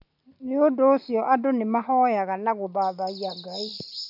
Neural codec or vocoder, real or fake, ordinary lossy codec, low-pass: none; real; none; 5.4 kHz